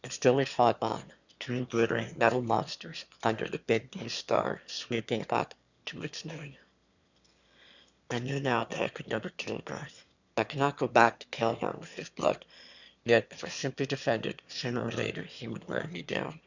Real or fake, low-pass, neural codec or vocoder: fake; 7.2 kHz; autoencoder, 22.05 kHz, a latent of 192 numbers a frame, VITS, trained on one speaker